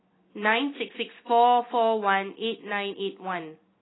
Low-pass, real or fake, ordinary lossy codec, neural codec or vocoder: 7.2 kHz; real; AAC, 16 kbps; none